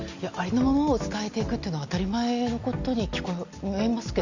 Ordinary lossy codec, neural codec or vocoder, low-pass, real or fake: Opus, 64 kbps; none; 7.2 kHz; real